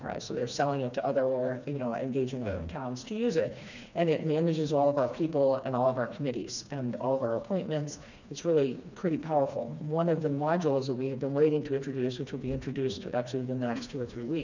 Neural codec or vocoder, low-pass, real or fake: codec, 16 kHz, 2 kbps, FreqCodec, smaller model; 7.2 kHz; fake